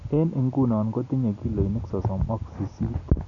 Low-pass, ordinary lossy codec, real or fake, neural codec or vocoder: 7.2 kHz; none; real; none